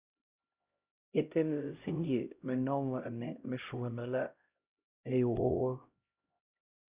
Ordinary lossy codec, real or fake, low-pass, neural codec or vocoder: Opus, 64 kbps; fake; 3.6 kHz; codec, 16 kHz, 0.5 kbps, X-Codec, HuBERT features, trained on LibriSpeech